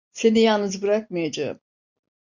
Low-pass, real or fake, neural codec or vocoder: 7.2 kHz; real; none